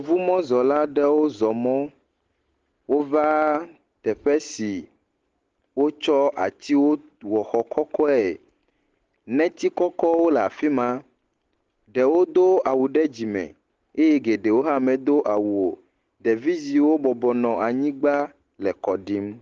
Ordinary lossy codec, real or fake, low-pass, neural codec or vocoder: Opus, 16 kbps; real; 7.2 kHz; none